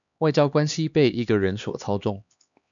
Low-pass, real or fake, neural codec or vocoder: 7.2 kHz; fake; codec, 16 kHz, 2 kbps, X-Codec, HuBERT features, trained on LibriSpeech